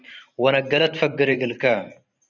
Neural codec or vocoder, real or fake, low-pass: vocoder, 44.1 kHz, 128 mel bands every 512 samples, BigVGAN v2; fake; 7.2 kHz